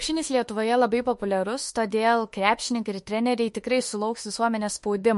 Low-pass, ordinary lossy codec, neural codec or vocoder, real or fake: 14.4 kHz; MP3, 48 kbps; autoencoder, 48 kHz, 32 numbers a frame, DAC-VAE, trained on Japanese speech; fake